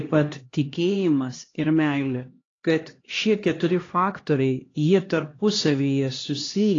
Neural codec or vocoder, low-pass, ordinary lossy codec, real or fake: codec, 16 kHz, 1 kbps, X-Codec, HuBERT features, trained on LibriSpeech; 7.2 kHz; AAC, 32 kbps; fake